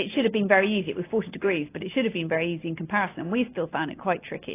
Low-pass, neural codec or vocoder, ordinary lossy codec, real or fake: 3.6 kHz; none; AAC, 24 kbps; real